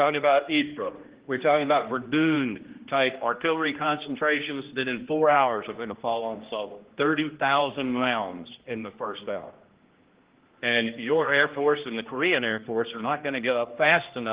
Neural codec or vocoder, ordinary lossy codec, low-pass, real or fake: codec, 16 kHz, 1 kbps, X-Codec, HuBERT features, trained on general audio; Opus, 16 kbps; 3.6 kHz; fake